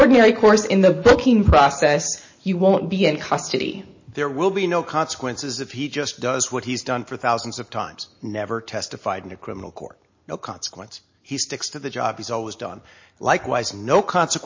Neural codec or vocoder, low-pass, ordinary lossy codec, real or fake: none; 7.2 kHz; MP3, 32 kbps; real